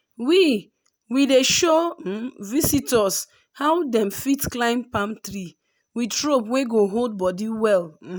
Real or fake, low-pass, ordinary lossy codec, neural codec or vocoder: real; none; none; none